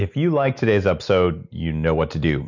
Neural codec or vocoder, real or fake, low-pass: none; real; 7.2 kHz